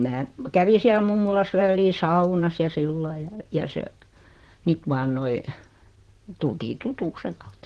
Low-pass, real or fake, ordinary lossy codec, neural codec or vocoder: 10.8 kHz; fake; Opus, 16 kbps; vocoder, 44.1 kHz, 128 mel bands, Pupu-Vocoder